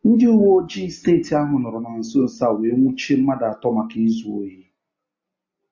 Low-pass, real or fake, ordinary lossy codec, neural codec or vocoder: 7.2 kHz; real; MP3, 48 kbps; none